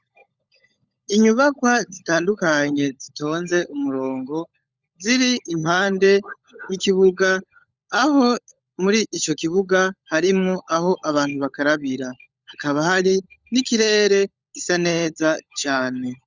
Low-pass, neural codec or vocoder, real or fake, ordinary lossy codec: 7.2 kHz; codec, 16 kHz, 16 kbps, FunCodec, trained on LibriTTS, 50 frames a second; fake; Opus, 64 kbps